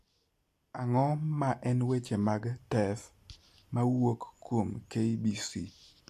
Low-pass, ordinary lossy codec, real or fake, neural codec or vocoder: 14.4 kHz; AAC, 64 kbps; real; none